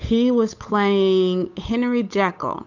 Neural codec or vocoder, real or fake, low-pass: codec, 16 kHz, 8 kbps, FunCodec, trained on Chinese and English, 25 frames a second; fake; 7.2 kHz